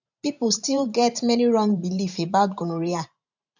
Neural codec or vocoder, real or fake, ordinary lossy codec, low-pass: vocoder, 44.1 kHz, 128 mel bands every 512 samples, BigVGAN v2; fake; none; 7.2 kHz